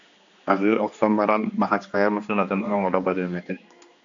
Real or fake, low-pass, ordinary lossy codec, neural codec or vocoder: fake; 7.2 kHz; MP3, 64 kbps; codec, 16 kHz, 2 kbps, X-Codec, HuBERT features, trained on general audio